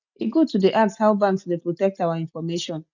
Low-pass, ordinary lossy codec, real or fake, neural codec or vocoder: 7.2 kHz; none; real; none